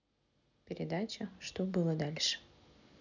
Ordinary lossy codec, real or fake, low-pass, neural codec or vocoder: none; real; 7.2 kHz; none